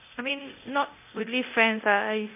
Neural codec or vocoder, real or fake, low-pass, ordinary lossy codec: codec, 24 kHz, 0.9 kbps, DualCodec; fake; 3.6 kHz; none